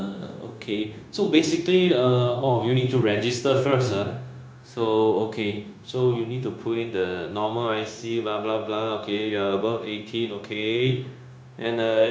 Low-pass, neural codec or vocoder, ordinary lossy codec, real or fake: none; codec, 16 kHz, 0.9 kbps, LongCat-Audio-Codec; none; fake